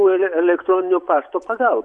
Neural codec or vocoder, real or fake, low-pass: vocoder, 24 kHz, 100 mel bands, Vocos; fake; 10.8 kHz